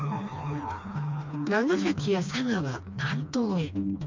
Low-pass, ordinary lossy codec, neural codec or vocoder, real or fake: 7.2 kHz; MP3, 48 kbps; codec, 16 kHz, 2 kbps, FreqCodec, smaller model; fake